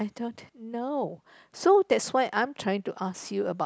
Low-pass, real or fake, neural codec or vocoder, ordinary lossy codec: none; real; none; none